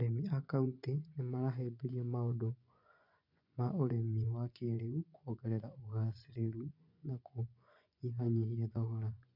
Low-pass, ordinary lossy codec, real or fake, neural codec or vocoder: 5.4 kHz; none; fake; vocoder, 24 kHz, 100 mel bands, Vocos